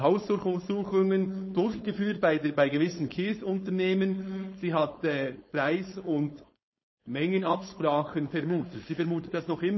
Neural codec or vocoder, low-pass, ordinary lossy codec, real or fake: codec, 16 kHz, 4.8 kbps, FACodec; 7.2 kHz; MP3, 24 kbps; fake